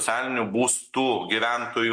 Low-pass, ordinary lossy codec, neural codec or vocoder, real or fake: 9.9 kHz; MP3, 48 kbps; none; real